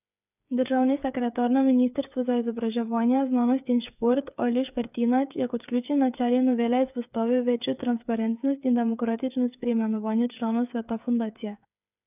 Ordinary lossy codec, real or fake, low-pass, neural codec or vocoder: none; fake; 3.6 kHz; codec, 16 kHz, 8 kbps, FreqCodec, smaller model